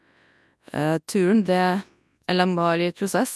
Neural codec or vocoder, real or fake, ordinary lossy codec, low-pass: codec, 24 kHz, 0.9 kbps, WavTokenizer, large speech release; fake; none; none